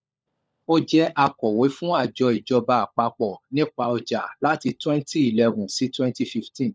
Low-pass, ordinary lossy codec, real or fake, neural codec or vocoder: none; none; fake; codec, 16 kHz, 16 kbps, FunCodec, trained on LibriTTS, 50 frames a second